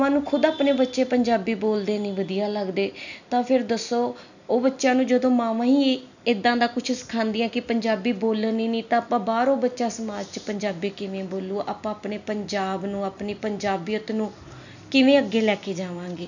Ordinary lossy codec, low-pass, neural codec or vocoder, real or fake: none; 7.2 kHz; none; real